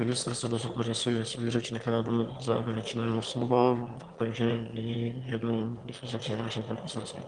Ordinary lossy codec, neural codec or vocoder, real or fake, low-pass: Opus, 32 kbps; autoencoder, 22.05 kHz, a latent of 192 numbers a frame, VITS, trained on one speaker; fake; 9.9 kHz